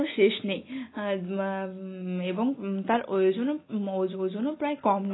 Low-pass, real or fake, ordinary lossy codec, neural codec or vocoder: 7.2 kHz; real; AAC, 16 kbps; none